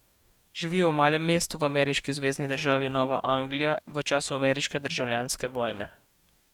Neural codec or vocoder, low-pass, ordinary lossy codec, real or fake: codec, 44.1 kHz, 2.6 kbps, DAC; 19.8 kHz; none; fake